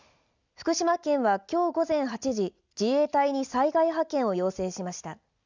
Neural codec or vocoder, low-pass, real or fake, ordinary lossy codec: none; 7.2 kHz; real; none